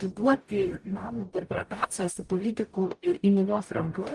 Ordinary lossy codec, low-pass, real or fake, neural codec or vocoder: Opus, 32 kbps; 10.8 kHz; fake; codec, 44.1 kHz, 0.9 kbps, DAC